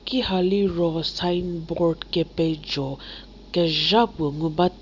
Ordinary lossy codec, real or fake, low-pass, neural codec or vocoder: Opus, 64 kbps; real; 7.2 kHz; none